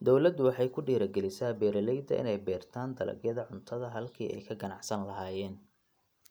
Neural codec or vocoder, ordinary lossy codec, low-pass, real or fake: none; none; none; real